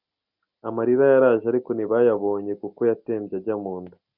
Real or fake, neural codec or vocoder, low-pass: real; none; 5.4 kHz